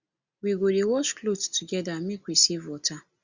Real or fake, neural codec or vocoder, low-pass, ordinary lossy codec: real; none; 7.2 kHz; Opus, 64 kbps